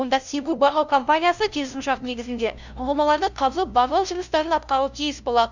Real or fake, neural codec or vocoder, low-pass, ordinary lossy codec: fake; codec, 16 kHz, 0.5 kbps, FunCodec, trained on LibriTTS, 25 frames a second; 7.2 kHz; none